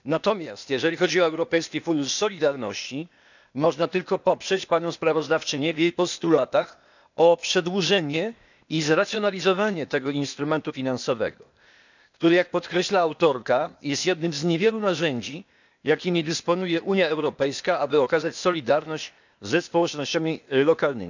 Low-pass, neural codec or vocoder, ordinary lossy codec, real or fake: 7.2 kHz; codec, 16 kHz, 0.8 kbps, ZipCodec; none; fake